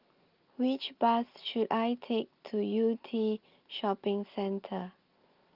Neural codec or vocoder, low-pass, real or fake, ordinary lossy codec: none; 5.4 kHz; real; Opus, 32 kbps